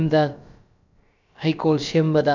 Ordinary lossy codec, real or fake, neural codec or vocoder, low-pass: none; fake; codec, 16 kHz, about 1 kbps, DyCAST, with the encoder's durations; 7.2 kHz